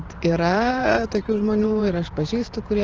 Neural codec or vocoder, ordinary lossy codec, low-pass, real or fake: vocoder, 44.1 kHz, 128 mel bands every 512 samples, BigVGAN v2; Opus, 16 kbps; 7.2 kHz; fake